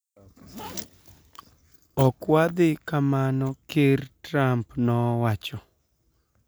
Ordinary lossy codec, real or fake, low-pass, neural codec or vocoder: none; real; none; none